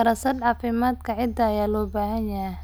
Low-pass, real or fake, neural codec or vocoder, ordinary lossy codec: none; real; none; none